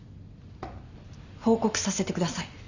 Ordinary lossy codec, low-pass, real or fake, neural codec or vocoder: Opus, 64 kbps; 7.2 kHz; real; none